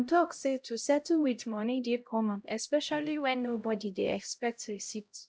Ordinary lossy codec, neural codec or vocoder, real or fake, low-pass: none; codec, 16 kHz, 0.5 kbps, X-Codec, HuBERT features, trained on LibriSpeech; fake; none